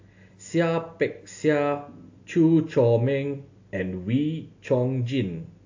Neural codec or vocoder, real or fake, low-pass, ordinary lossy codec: none; real; 7.2 kHz; AAC, 48 kbps